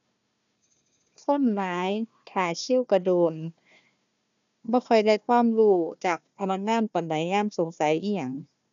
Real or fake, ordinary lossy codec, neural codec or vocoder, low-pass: fake; none; codec, 16 kHz, 1 kbps, FunCodec, trained on Chinese and English, 50 frames a second; 7.2 kHz